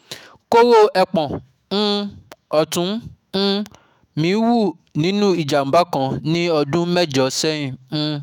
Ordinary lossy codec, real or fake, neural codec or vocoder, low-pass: none; fake; autoencoder, 48 kHz, 128 numbers a frame, DAC-VAE, trained on Japanese speech; 19.8 kHz